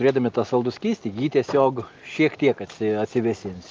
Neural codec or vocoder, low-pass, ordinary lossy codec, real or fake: none; 7.2 kHz; Opus, 24 kbps; real